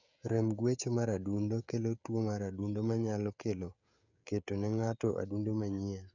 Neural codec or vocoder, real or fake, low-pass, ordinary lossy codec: codec, 16 kHz, 16 kbps, FreqCodec, smaller model; fake; 7.2 kHz; none